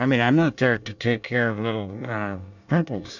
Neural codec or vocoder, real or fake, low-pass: codec, 24 kHz, 1 kbps, SNAC; fake; 7.2 kHz